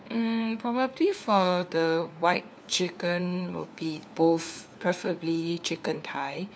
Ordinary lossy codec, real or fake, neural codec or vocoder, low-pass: none; fake; codec, 16 kHz, 4 kbps, FunCodec, trained on LibriTTS, 50 frames a second; none